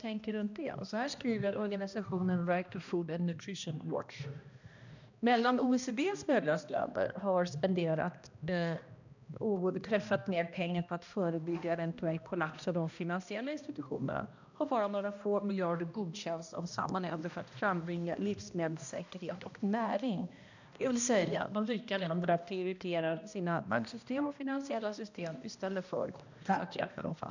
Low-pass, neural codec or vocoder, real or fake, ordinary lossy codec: 7.2 kHz; codec, 16 kHz, 1 kbps, X-Codec, HuBERT features, trained on balanced general audio; fake; none